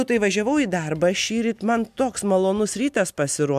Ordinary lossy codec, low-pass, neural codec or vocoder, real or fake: MP3, 96 kbps; 14.4 kHz; none; real